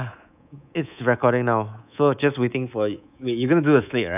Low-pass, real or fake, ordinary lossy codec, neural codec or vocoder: 3.6 kHz; fake; none; codec, 24 kHz, 3.1 kbps, DualCodec